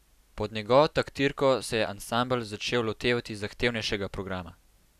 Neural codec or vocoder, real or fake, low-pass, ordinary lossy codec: none; real; 14.4 kHz; none